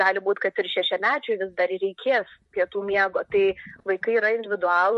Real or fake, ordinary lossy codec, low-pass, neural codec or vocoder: fake; MP3, 48 kbps; 14.4 kHz; vocoder, 44.1 kHz, 128 mel bands, Pupu-Vocoder